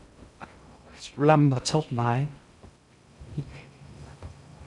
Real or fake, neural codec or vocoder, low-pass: fake; codec, 16 kHz in and 24 kHz out, 0.6 kbps, FocalCodec, streaming, 2048 codes; 10.8 kHz